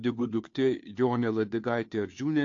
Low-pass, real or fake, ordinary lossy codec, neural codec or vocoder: 7.2 kHz; fake; AAC, 48 kbps; codec, 16 kHz, 2 kbps, FunCodec, trained on Chinese and English, 25 frames a second